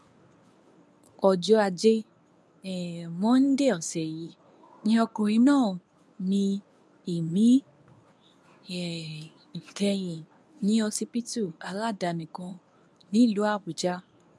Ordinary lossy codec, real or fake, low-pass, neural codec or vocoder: none; fake; none; codec, 24 kHz, 0.9 kbps, WavTokenizer, medium speech release version 1